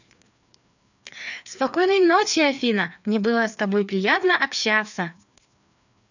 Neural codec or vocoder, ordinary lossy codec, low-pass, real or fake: codec, 16 kHz, 2 kbps, FreqCodec, larger model; none; 7.2 kHz; fake